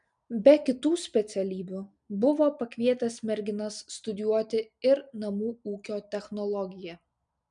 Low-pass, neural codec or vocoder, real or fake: 9.9 kHz; none; real